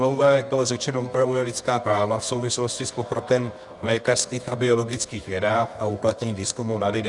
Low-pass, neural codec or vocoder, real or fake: 10.8 kHz; codec, 24 kHz, 0.9 kbps, WavTokenizer, medium music audio release; fake